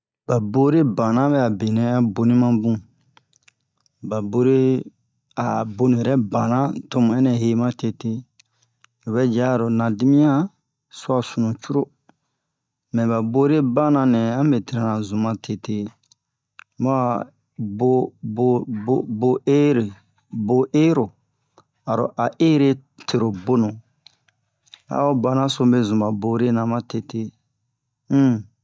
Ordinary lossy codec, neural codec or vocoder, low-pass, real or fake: none; none; none; real